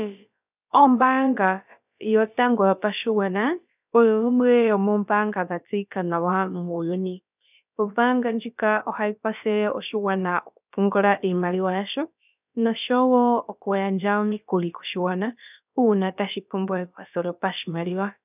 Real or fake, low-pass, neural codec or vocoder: fake; 3.6 kHz; codec, 16 kHz, about 1 kbps, DyCAST, with the encoder's durations